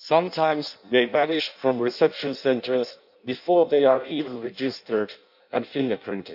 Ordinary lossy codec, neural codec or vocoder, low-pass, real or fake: none; codec, 16 kHz in and 24 kHz out, 0.6 kbps, FireRedTTS-2 codec; 5.4 kHz; fake